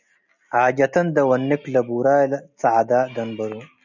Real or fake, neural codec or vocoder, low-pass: real; none; 7.2 kHz